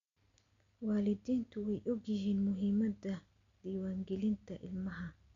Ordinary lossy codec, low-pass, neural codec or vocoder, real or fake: AAC, 64 kbps; 7.2 kHz; none; real